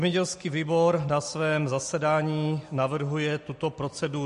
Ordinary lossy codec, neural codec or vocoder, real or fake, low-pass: MP3, 48 kbps; none; real; 14.4 kHz